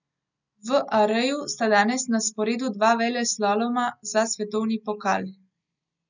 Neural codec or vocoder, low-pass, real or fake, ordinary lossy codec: none; 7.2 kHz; real; none